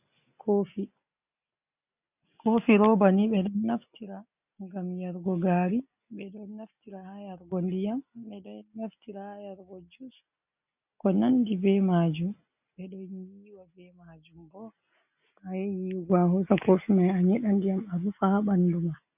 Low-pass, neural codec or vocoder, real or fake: 3.6 kHz; none; real